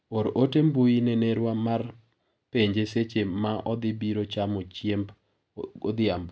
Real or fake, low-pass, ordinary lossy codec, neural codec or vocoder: real; none; none; none